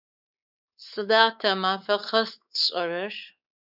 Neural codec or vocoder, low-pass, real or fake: codec, 16 kHz, 4 kbps, X-Codec, WavLM features, trained on Multilingual LibriSpeech; 5.4 kHz; fake